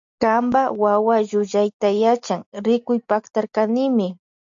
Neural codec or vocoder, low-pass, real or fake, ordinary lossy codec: none; 7.2 kHz; real; AAC, 64 kbps